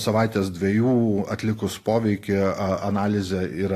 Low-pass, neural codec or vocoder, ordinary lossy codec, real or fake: 14.4 kHz; none; AAC, 48 kbps; real